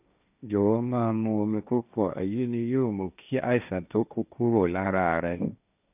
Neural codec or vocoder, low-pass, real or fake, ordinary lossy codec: codec, 16 kHz, 1.1 kbps, Voila-Tokenizer; 3.6 kHz; fake; none